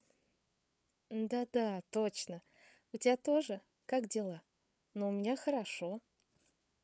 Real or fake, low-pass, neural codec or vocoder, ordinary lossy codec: fake; none; codec, 16 kHz, 8 kbps, FreqCodec, larger model; none